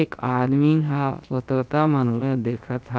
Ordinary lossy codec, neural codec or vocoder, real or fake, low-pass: none; codec, 16 kHz, about 1 kbps, DyCAST, with the encoder's durations; fake; none